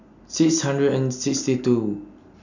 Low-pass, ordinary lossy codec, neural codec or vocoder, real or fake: 7.2 kHz; none; none; real